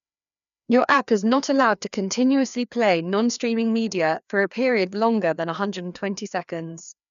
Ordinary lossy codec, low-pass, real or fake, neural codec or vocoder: none; 7.2 kHz; fake; codec, 16 kHz, 2 kbps, FreqCodec, larger model